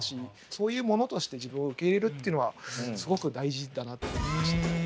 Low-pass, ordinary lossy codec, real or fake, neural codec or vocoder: none; none; real; none